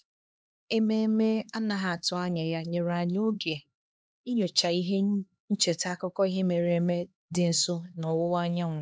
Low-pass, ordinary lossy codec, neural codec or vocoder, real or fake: none; none; codec, 16 kHz, 2 kbps, X-Codec, HuBERT features, trained on LibriSpeech; fake